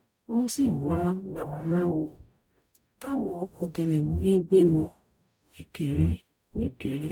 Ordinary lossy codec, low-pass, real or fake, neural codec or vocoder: none; 19.8 kHz; fake; codec, 44.1 kHz, 0.9 kbps, DAC